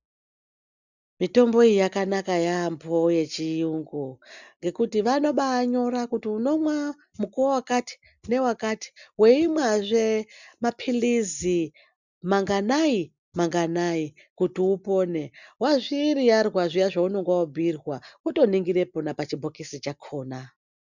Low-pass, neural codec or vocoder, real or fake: 7.2 kHz; none; real